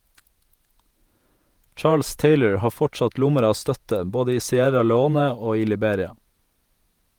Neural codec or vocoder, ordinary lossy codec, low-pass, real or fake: vocoder, 48 kHz, 128 mel bands, Vocos; Opus, 32 kbps; 19.8 kHz; fake